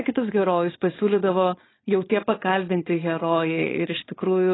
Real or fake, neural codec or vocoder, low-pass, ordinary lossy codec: fake; codec, 16 kHz, 4.8 kbps, FACodec; 7.2 kHz; AAC, 16 kbps